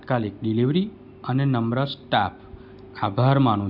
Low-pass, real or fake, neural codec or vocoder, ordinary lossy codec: 5.4 kHz; real; none; Opus, 64 kbps